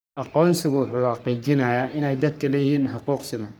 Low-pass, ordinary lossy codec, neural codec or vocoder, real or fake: none; none; codec, 44.1 kHz, 3.4 kbps, Pupu-Codec; fake